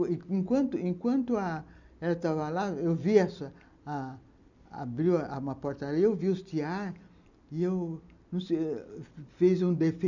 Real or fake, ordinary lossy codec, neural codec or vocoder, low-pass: real; none; none; 7.2 kHz